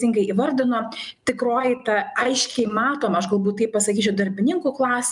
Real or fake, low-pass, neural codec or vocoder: real; 10.8 kHz; none